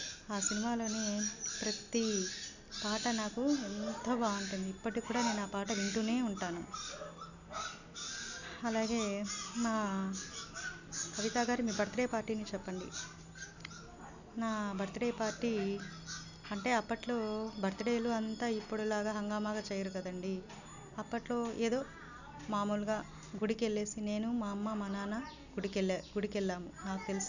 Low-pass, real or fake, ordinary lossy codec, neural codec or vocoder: 7.2 kHz; real; none; none